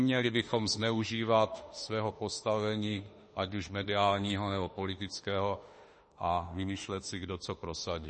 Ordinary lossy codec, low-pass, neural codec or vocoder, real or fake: MP3, 32 kbps; 10.8 kHz; autoencoder, 48 kHz, 32 numbers a frame, DAC-VAE, trained on Japanese speech; fake